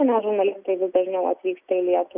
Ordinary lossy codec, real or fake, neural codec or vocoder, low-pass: Opus, 64 kbps; real; none; 3.6 kHz